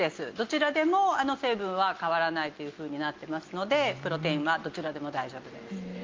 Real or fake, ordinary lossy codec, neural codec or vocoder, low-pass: real; Opus, 32 kbps; none; 7.2 kHz